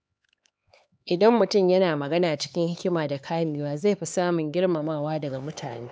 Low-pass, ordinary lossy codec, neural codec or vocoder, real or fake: none; none; codec, 16 kHz, 4 kbps, X-Codec, HuBERT features, trained on LibriSpeech; fake